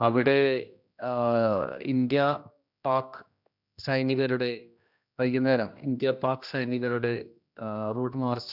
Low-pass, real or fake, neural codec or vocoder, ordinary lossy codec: 5.4 kHz; fake; codec, 16 kHz, 1 kbps, X-Codec, HuBERT features, trained on general audio; none